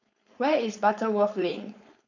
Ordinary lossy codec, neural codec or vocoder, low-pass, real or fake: none; codec, 16 kHz, 4.8 kbps, FACodec; 7.2 kHz; fake